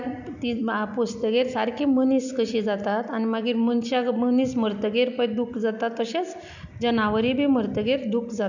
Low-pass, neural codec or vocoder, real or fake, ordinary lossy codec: 7.2 kHz; none; real; none